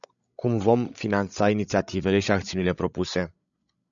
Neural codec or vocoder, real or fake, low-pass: codec, 16 kHz, 16 kbps, FreqCodec, larger model; fake; 7.2 kHz